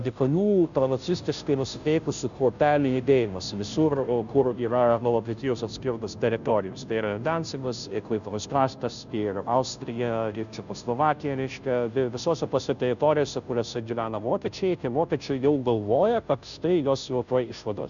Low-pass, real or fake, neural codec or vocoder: 7.2 kHz; fake; codec, 16 kHz, 0.5 kbps, FunCodec, trained on Chinese and English, 25 frames a second